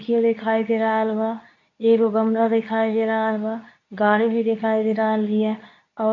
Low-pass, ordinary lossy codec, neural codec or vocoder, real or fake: 7.2 kHz; none; codec, 24 kHz, 0.9 kbps, WavTokenizer, medium speech release version 2; fake